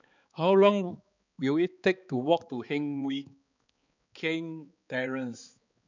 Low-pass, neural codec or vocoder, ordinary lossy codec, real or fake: 7.2 kHz; codec, 16 kHz, 4 kbps, X-Codec, HuBERT features, trained on balanced general audio; none; fake